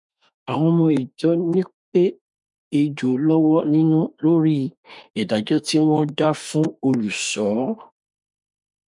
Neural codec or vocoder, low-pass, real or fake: autoencoder, 48 kHz, 32 numbers a frame, DAC-VAE, trained on Japanese speech; 10.8 kHz; fake